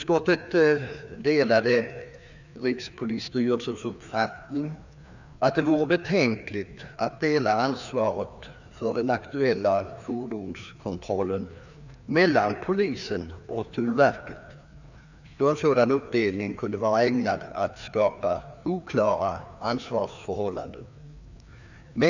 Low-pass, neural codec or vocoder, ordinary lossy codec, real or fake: 7.2 kHz; codec, 16 kHz, 2 kbps, FreqCodec, larger model; none; fake